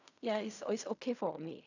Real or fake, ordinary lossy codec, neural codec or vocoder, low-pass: fake; none; codec, 16 kHz in and 24 kHz out, 0.4 kbps, LongCat-Audio-Codec, fine tuned four codebook decoder; 7.2 kHz